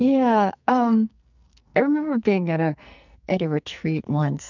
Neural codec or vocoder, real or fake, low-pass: codec, 44.1 kHz, 2.6 kbps, SNAC; fake; 7.2 kHz